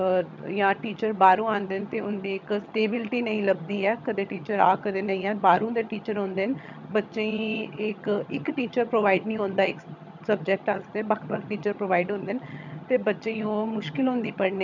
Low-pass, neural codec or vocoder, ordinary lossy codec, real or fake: 7.2 kHz; vocoder, 22.05 kHz, 80 mel bands, HiFi-GAN; none; fake